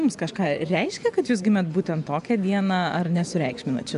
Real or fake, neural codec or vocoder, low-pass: fake; vocoder, 24 kHz, 100 mel bands, Vocos; 10.8 kHz